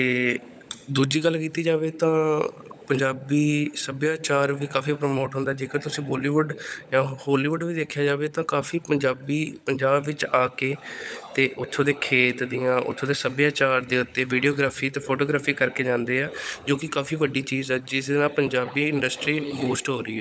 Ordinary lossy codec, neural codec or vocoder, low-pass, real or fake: none; codec, 16 kHz, 16 kbps, FunCodec, trained on LibriTTS, 50 frames a second; none; fake